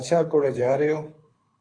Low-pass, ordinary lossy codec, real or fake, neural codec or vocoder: 9.9 kHz; AAC, 32 kbps; fake; codec, 24 kHz, 6 kbps, HILCodec